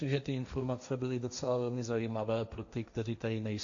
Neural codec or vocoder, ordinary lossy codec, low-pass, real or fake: codec, 16 kHz, 1.1 kbps, Voila-Tokenizer; AAC, 64 kbps; 7.2 kHz; fake